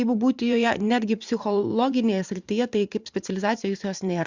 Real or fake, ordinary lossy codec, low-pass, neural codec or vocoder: fake; Opus, 64 kbps; 7.2 kHz; vocoder, 44.1 kHz, 128 mel bands every 512 samples, BigVGAN v2